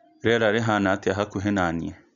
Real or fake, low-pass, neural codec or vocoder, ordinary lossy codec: real; 7.2 kHz; none; none